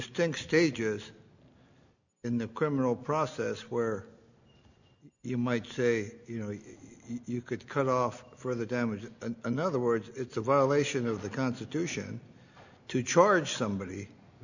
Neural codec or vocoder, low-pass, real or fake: none; 7.2 kHz; real